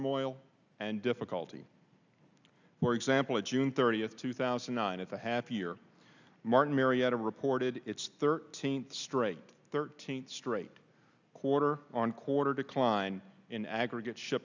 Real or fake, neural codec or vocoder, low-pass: real; none; 7.2 kHz